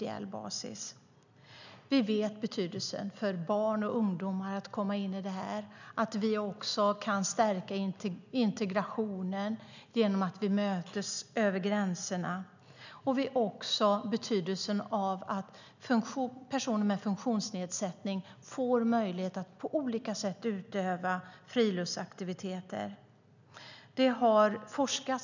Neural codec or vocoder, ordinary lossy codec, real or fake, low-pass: none; none; real; 7.2 kHz